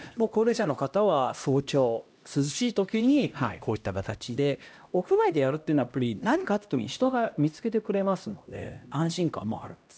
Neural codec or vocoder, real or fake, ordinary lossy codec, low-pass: codec, 16 kHz, 1 kbps, X-Codec, HuBERT features, trained on LibriSpeech; fake; none; none